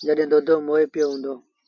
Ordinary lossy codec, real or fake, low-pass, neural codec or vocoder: MP3, 48 kbps; real; 7.2 kHz; none